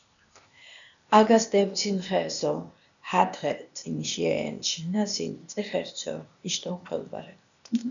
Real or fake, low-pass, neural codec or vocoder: fake; 7.2 kHz; codec, 16 kHz, 0.8 kbps, ZipCodec